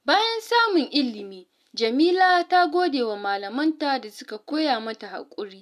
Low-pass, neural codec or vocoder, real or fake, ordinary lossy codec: 14.4 kHz; none; real; none